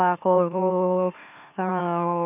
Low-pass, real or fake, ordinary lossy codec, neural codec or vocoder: 3.6 kHz; fake; none; autoencoder, 44.1 kHz, a latent of 192 numbers a frame, MeloTTS